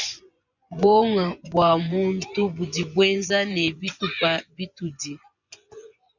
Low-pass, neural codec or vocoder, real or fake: 7.2 kHz; vocoder, 24 kHz, 100 mel bands, Vocos; fake